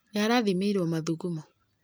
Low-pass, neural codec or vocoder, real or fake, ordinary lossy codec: none; none; real; none